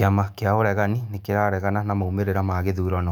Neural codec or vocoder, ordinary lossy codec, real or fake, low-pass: autoencoder, 48 kHz, 128 numbers a frame, DAC-VAE, trained on Japanese speech; none; fake; 19.8 kHz